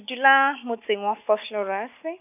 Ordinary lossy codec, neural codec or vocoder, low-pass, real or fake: none; none; 3.6 kHz; real